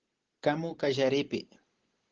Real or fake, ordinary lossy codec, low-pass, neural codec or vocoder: real; Opus, 16 kbps; 7.2 kHz; none